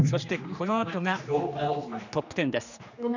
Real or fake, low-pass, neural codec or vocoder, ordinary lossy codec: fake; 7.2 kHz; codec, 16 kHz, 2 kbps, X-Codec, HuBERT features, trained on general audio; none